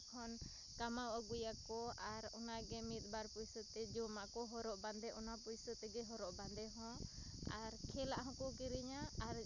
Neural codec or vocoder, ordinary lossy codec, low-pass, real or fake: none; none; 7.2 kHz; real